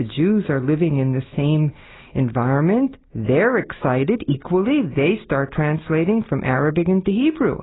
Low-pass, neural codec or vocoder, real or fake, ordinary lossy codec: 7.2 kHz; none; real; AAC, 16 kbps